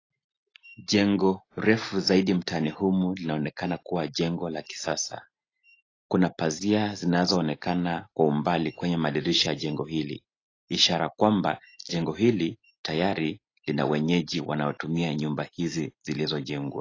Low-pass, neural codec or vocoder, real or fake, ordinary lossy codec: 7.2 kHz; none; real; AAC, 32 kbps